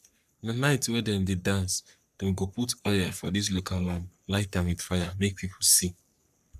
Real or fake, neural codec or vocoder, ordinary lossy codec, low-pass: fake; codec, 44.1 kHz, 3.4 kbps, Pupu-Codec; none; 14.4 kHz